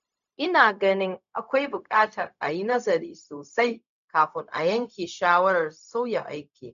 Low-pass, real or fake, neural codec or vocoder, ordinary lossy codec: 7.2 kHz; fake; codec, 16 kHz, 0.4 kbps, LongCat-Audio-Codec; none